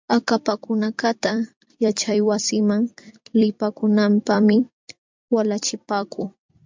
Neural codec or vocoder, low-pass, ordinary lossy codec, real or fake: vocoder, 44.1 kHz, 128 mel bands every 256 samples, BigVGAN v2; 7.2 kHz; MP3, 64 kbps; fake